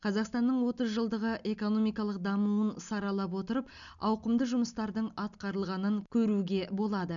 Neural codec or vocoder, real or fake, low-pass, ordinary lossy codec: none; real; 7.2 kHz; none